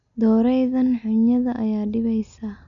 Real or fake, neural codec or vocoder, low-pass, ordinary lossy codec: real; none; 7.2 kHz; none